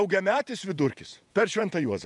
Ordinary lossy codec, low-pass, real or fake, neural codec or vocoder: MP3, 96 kbps; 10.8 kHz; fake; vocoder, 48 kHz, 128 mel bands, Vocos